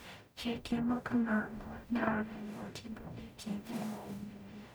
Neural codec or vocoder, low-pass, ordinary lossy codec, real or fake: codec, 44.1 kHz, 0.9 kbps, DAC; none; none; fake